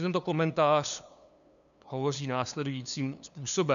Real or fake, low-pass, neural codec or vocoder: fake; 7.2 kHz; codec, 16 kHz, 2 kbps, FunCodec, trained on LibriTTS, 25 frames a second